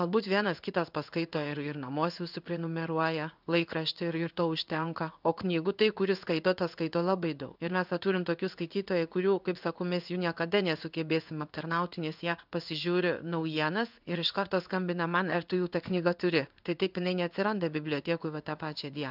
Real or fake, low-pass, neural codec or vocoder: fake; 5.4 kHz; codec, 16 kHz in and 24 kHz out, 1 kbps, XY-Tokenizer